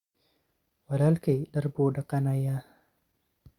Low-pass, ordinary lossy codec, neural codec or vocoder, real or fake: 19.8 kHz; Opus, 64 kbps; none; real